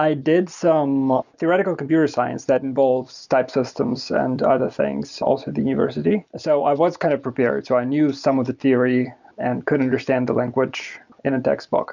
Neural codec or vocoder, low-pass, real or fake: none; 7.2 kHz; real